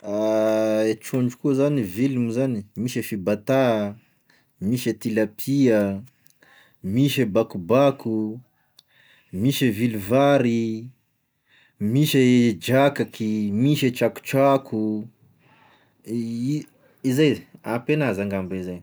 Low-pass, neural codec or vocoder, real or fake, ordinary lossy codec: none; none; real; none